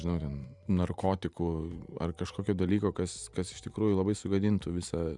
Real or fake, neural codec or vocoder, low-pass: fake; vocoder, 44.1 kHz, 128 mel bands every 512 samples, BigVGAN v2; 10.8 kHz